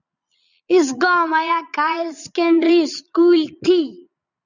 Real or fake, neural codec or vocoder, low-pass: fake; vocoder, 22.05 kHz, 80 mel bands, Vocos; 7.2 kHz